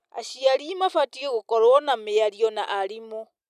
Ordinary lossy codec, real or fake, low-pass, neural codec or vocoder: none; real; 14.4 kHz; none